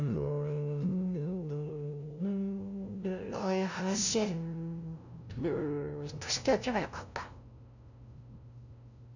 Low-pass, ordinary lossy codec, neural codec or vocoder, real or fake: 7.2 kHz; none; codec, 16 kHz, 0.5 kbps, FunCodec, trained on LibriTTS, 25 frames a second; fake